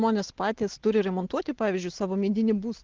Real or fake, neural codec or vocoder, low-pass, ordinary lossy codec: real; none; 7.2 kHz; Opus, 16 kbps